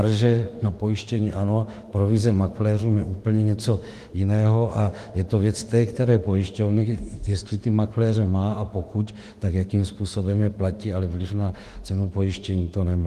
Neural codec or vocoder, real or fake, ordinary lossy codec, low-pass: autoencoder, 48 kHz, 32 numbers a frame, DAC-VAE, trained on Japanese speech; fake; Opus, 24 kbps; 14.4 kHz